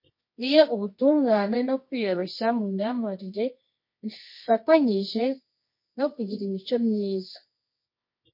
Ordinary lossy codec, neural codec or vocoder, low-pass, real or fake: MP3, 32 kbps; codec, 24 kHz, 0.9 kbps, WavTokenizer, medium music audio release; 5.4 kHz; fake